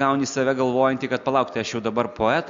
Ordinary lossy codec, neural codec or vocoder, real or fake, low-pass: MP3, 64 kbps; none; real; 7.2 kHz